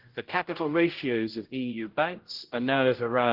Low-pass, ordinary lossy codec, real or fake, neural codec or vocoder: 5.4 kHz; Opus, 16 kbps; fake; codec, 16 kHz, 0.5 kbps, X-Codec, HuBERT features, trained on general audio